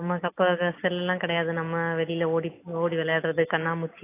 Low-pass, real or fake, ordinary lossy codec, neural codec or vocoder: 3.6 kHz; real; AAC, 24 kbps; none